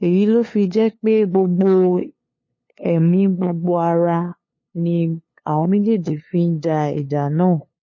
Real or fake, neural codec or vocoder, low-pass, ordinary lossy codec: fake; codec, 16 kHz, 2 kbps, FunCodec, trained on LibriTTS, 25 frames a second; 7.2 kHz; MP3, 32 kbps